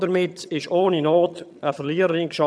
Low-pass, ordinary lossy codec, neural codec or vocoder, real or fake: none; none; vocoder, 22.05 kHz, 80 mel bands, HiFi-GAN; fake